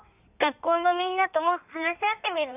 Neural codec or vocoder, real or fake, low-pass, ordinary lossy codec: codec, 16 kHz in and 24 kHz out, 1.1 kbps, FireRedTTS-2 codec; fake; 3.6 kHz; none